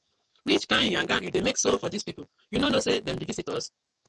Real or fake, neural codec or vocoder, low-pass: fake; codec, 44.1 kHz, 7.8 kbps, DAC; 10.8 kHz